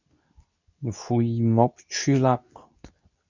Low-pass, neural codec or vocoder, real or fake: 7.2 kHz; codec, 24 kHz, 0.9 kbps, WavTokenizer, medium speech release version 2; fake